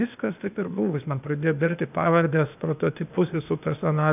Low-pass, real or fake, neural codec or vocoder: 3.6 kHz; fake; codec, 16 kHz, 0.8 kbps, ZipCodec